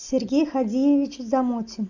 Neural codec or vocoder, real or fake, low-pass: none; real; 7.2 kHz